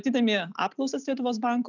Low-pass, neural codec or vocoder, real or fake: 7.2 kHz; none; real